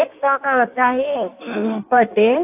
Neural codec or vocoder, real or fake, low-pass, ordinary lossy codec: codec, 16 kHz in and 24 kHz out, 1.1 kbps, FireRedTTS-2 codec; fake; 3.6 kHz; AAC, 32 kbps